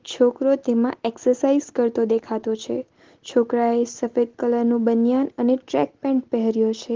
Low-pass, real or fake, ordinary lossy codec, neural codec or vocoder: 7.2 kHz; real; Opus, 16 kbps; none